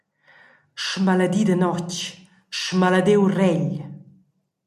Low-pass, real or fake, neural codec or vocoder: 14.4 kHz; real; none